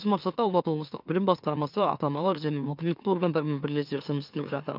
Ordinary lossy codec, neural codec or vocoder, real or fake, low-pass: none; autoencoder, 44.1 kHz, a latent of 192 numbers a frame, MeloTTS; fake; 5.4 kHz